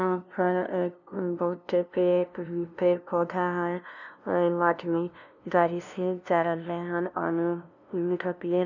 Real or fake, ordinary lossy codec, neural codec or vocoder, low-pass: fake; none; codec, 16 kHz, 0.5 kbps, FunCodec, trained on LibriTTS, 25 frames a second; 7.2 kHz